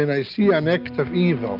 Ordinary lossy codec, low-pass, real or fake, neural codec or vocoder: Opus, 24 kbps; 5.4 kHz; real; none